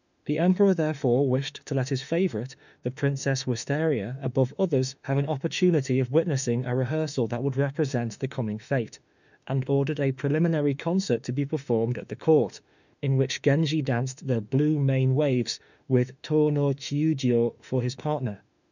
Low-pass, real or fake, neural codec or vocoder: 7.2 kHz; fake; autoencoder, 48 kHz, 32 numbers a frame, DAC-VAE, trained on Japanese speech